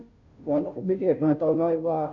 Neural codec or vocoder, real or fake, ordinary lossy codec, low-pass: codec, 16 kHz, 0.5 kbps, FunCodec, trained on Chinese and English, 25 frames a second; fake; AAC, 48 kbps; 7.2 kHz